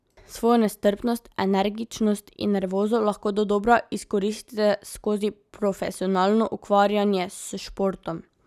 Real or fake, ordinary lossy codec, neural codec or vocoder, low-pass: real; none; none; 14.4 kHz